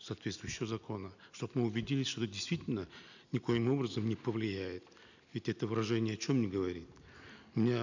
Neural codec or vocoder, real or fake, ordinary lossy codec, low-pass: none; real; none; 7.2 kHz